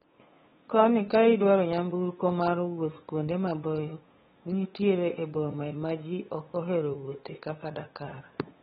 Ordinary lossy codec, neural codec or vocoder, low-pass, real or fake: AAC, 16 kbps; codec, 16 kHz, 8 kbps, FunCodec, trained on LibriTTS, 25 frames a second; 7.2 kHz; fake